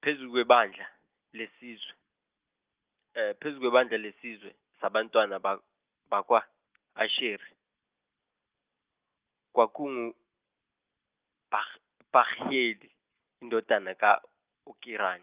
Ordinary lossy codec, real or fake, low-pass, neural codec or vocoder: Opus, 32 kbps; real; 3.6 kHz; none